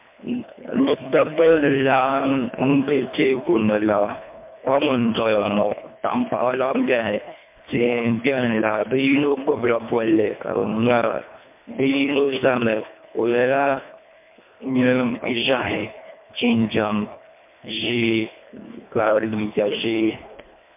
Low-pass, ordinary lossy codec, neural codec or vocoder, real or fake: 3.6 kHz; MP3, 32 kbps; codec, 24 kHz, 1.5 kbps, HILCodec; fake